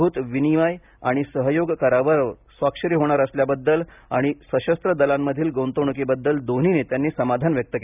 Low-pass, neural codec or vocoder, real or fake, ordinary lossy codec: 3.6 kHz; none; real; none